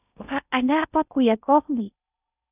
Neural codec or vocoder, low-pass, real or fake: codec, 16 kHz in and 24 kHz out, 0.6 kbps, FocalCodec, streaming, 2048 codes; 3.6 kHz; fake